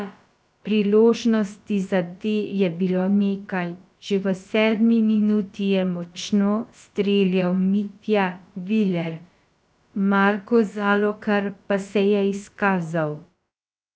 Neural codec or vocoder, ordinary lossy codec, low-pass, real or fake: codec, 16 kHz, about 1 kbps, DyCAST, with the encoder's durations; none; none; fake